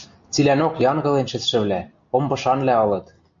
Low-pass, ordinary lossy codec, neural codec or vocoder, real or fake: 7.2 kHz; MP3, 64 kbps; none; real